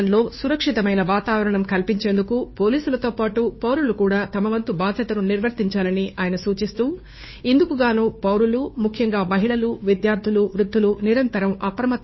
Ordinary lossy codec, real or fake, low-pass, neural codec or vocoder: MP3, 24 kbps; fake; 7.2 kHz; codec, 16 kHz, 2 kbps, FunCodec, trained on Chinese and English, 25 frames a second